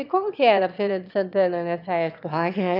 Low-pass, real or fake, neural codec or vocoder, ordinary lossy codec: 5.4 kHz; fake; autoencoder, 22.05 kHz, a latent of 192 numbers a frame, VITS, trained on one speaker; none